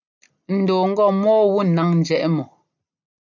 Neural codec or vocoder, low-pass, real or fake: none; 7.2 kHz; real